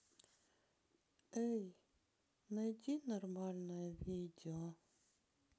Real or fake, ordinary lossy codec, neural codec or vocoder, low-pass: real; none; none; none